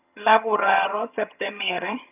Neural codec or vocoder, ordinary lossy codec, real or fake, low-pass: vocoder, 22.05 kHz, 80 mel bands, HiFi-GAN; none; fake; 3.6 kHz